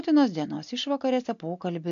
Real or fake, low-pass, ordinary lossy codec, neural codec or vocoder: real; 7.2 kHz; AAC, 96 kbps; none